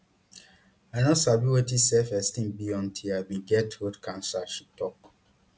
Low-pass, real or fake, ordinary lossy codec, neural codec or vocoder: none; real; none; none